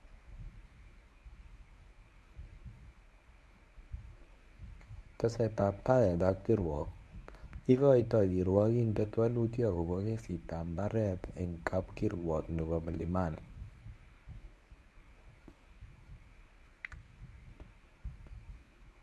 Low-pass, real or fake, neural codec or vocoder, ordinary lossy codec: none; fake; codec, 24 kHz, 0.9 kbps, WavTokenizer, medium speech release version 1; none